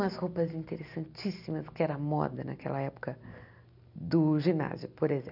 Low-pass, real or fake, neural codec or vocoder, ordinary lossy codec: 5.4 kHz; real; none; none